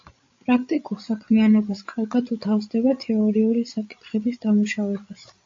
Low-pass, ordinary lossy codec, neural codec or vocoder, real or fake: 7.2 kHz; AAC, 48 kbps; codec, 16 kHz, 16 kbps, FreqCodec, larger model; fake